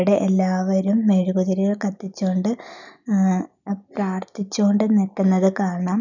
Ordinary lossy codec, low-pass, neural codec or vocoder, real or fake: none; 7.2 kHz; none; real